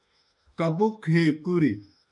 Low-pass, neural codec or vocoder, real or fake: 10.8 kHz; autoencoder, 48 kHz, 32 numbers a frame, DAC-VAE, trained on Japanese speech; fake